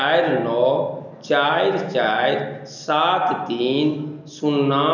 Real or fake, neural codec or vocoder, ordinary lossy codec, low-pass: real; none; none; 7.2 kHz